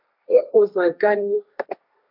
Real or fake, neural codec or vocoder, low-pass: fake; codec, 16 kHz, 1.1 kbps, Voila-Tokenizer; 5.4 kHz